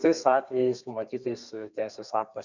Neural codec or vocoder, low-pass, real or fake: codec, 32 kHz, 1.9 kbps, SNAC; 7.2 kHz; fake